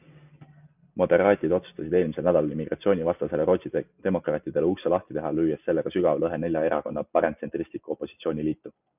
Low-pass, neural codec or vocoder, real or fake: 3.6 kHz; none; real